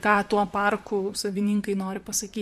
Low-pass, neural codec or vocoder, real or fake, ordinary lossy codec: 14.4 kHz; vocoder, 44.1 kHz, 128 mel bands, Pupu-Vocoder; fake; MP3, 64 kbps